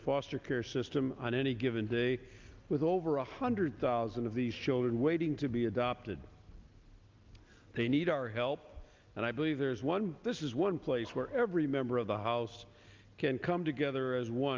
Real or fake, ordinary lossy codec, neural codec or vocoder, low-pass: real; Opus, 24 kbps; none; 7.2 kHz